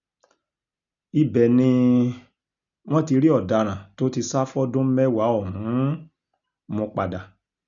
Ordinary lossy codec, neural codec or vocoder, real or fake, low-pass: none; none; real; 7.2 kHz